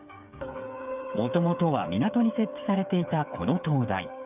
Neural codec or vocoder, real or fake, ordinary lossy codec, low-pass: codec, 16 kHz, 8 kbps, FreqCodec, smaller model; fake; none; 3.6 kHz